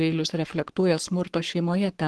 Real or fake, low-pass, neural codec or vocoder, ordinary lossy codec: fake; 10.8 kHz; codec, 44.1 kHz, 7.8 kbps, Pupu-Codec; Opus, 16 kbps